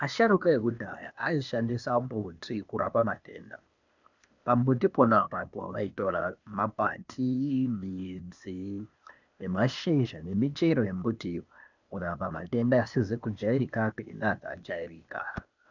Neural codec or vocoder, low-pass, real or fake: codec, 16 kHz, 0.8 kbps, ZipCodec; 7.2 kHz; fake